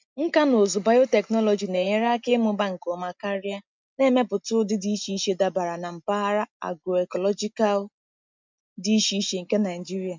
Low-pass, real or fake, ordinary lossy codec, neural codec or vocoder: 7.2 kHz; real; MP3, 64 kbps; none